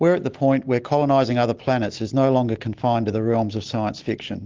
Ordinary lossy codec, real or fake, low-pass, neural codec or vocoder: Opus, 16 kbps; real; 7.2 kHz; none